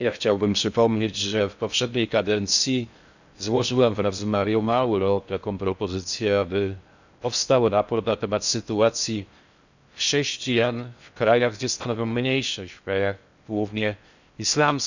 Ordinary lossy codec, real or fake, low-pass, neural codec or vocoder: none; fake; 7.2 kHz; codec, 16 kHz in and 24 kHz out, 0.6 kbps, FocalCodec, streaming, 4096 codes